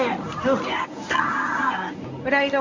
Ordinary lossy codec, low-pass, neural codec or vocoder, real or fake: MP3, 64 kbps; 7.2 kHz; codec, 24 kHz, 0.9 kbps, WavTokenizer, medium speech release version 1; fake